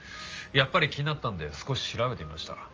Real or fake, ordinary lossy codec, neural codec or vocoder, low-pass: real; Opus, 24 kbps; none; 7.2 kHz